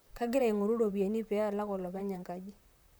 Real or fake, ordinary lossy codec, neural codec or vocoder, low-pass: fake; none; vocoder, 44.1 kHz, 128 mel bands, Pupu-Vocoder; none